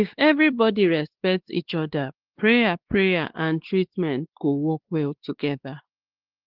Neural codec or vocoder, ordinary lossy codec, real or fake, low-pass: codec, 16 kHz, 2 kbps, X-Codec, WavLM features, trained on Multilingual LibriSpeech; Opus, 16 kbps; fake; 5.4 kHz